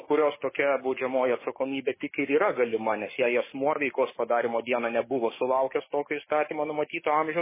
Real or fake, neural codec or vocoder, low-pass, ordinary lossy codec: fake; codec, 44.1 kHz, 7.8 kbps, DAC; 3.6 kHz; MP3, 16 kbps